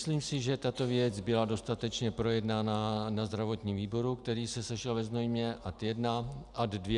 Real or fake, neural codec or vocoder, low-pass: real; none; 10.8 kHz